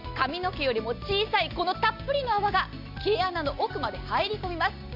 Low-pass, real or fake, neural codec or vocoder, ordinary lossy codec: 5.4 kHz; real; none; none